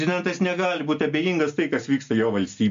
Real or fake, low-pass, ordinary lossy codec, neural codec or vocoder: real; 7.2 kHz; MP3, 48 kbps; none